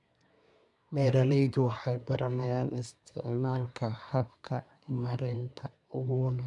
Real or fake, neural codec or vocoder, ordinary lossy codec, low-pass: fake; codec, 24 kHz, 1 kbps, SNAC; none; 10.8 kHz